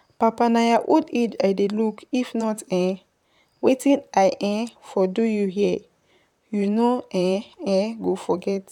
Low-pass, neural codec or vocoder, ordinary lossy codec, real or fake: 19.8 kHz; vocoder, 44.1 kHz, 128 mel bands, Pupu-Vocoder; none; fake